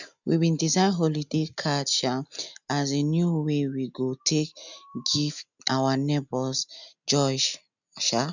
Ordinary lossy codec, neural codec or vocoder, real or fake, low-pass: none; none; real; 7.2 kHz